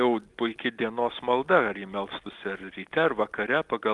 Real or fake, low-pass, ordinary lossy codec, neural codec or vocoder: real; 10.8 kHz; Opus, 24 kbps; none